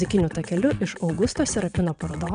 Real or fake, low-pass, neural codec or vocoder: fake; 9.9 kHz; vocoder, 22.05 kHz, 80 mel bands, WaveNeXt